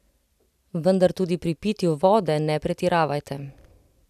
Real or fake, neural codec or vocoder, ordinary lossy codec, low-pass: real; none; none; 14.4 kHz